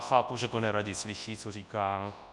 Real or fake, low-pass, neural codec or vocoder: fake; 10.8 kHz; codec, 24 kHz, 0.9 kbps, WavTokenizer, large speech release